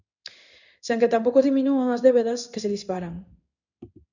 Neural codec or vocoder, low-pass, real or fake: codec, 16 kHz in and 24 kHz out, 1 kbps, XY-Tokenizer; 7.2 kHz; fake